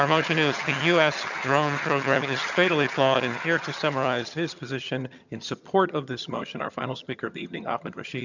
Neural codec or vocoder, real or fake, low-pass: vocoder, 22.05 kHz, 80 mel bands, HiFi-GAN; fake; 7.2 kHz